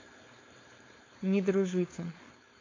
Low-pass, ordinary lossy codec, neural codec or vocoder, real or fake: 7.2 kHz; AAC, 32 kbps; codec, 16 kHz, 4.8 kbps, FACodec; fake